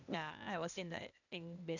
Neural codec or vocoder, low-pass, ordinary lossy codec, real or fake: codec, 16 kHz, 0.8 kbps, ZipCodec; 7.2 kHz; none; fake